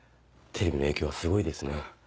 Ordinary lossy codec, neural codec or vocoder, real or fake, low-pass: none; none; real; none